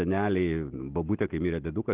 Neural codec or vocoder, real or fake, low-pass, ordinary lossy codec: none; real; 3.6 kHz; Opus, 16 kbps